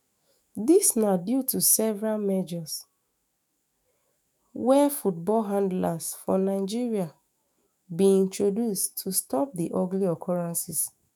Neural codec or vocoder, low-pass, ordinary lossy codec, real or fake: autoencoder, 48 kHz, 128 numbers a frame, DAC-VAE, trained on Japanese speech; none; none; fake